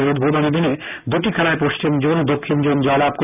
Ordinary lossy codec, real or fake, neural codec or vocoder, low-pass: none; real; none; 3.6 kHz